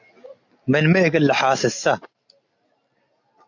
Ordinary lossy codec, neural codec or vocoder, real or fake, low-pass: AAC, 48 kbps; none; real; 7.2 kHz